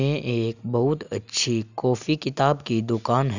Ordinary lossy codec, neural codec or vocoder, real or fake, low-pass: none; none; real; 7.2 kHz